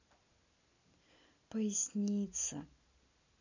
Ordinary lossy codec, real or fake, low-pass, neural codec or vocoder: none; real; 7.2 kHz; none